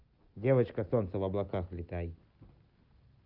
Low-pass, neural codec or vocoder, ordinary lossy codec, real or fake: 5.4 kHz; none; Opus, 32 kbps; real